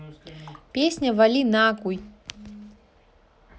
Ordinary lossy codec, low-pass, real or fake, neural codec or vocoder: none; none; real; none